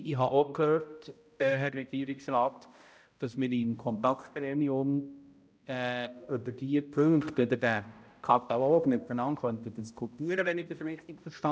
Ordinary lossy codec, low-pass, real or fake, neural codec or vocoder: none; none; fake; codec, 16 kHz, 0.5 kbps, X-Codec, HuBERT features, trained on balanced general audio